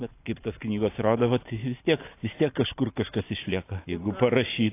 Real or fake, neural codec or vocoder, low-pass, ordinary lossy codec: real; none; 3.6 kHz; AAC, 24 kbps